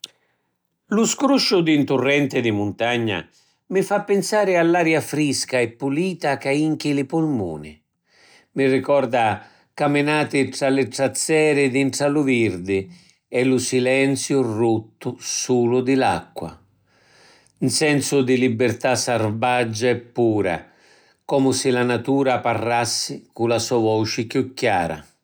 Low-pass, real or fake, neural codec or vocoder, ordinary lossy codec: none; real; none; none